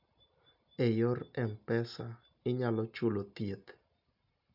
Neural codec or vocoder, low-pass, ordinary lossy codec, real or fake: none; 5.4 kHz; none; real